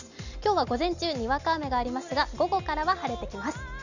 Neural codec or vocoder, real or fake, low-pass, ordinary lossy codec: none; real; 7.2 kHz; none